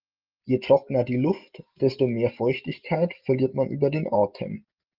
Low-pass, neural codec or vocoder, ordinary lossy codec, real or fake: 5.4 kHz; none; Opus, 32 kbps; real